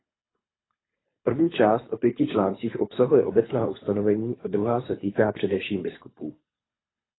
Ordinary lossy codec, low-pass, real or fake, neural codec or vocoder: AAC, 16 kbps; 7.2 kHz; fake; codec, 24 kHz, 3 kbps, HILCodec